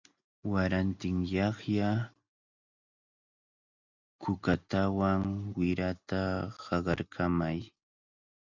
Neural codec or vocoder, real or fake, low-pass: none; real; 7.2 kHz